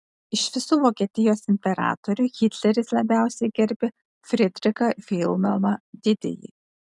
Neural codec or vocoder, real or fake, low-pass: none; real; 10.8 kHz